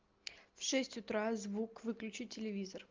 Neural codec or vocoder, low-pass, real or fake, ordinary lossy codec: none; 7.2 kHz; real; Opus, 24 kbps